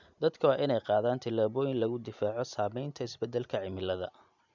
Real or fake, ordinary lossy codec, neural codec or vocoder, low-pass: real; none; none; 7.2 kHz